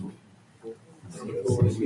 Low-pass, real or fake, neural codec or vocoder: 10.8 kHz; real; none